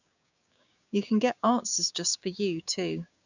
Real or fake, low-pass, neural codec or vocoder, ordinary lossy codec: fake; 7.2 kHz; codec, 16 kHz, 6 kbps, DAC; none